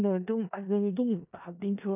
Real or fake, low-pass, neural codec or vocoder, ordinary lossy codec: fake; 3.6 kHz; codec, 16 kHz in and 24 kHz out, 0.4 kbps, LongCat-Audio-Codec, four codebook decoder; none